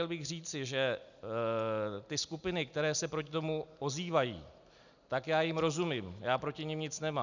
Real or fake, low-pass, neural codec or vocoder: real; 7.2 kHz; none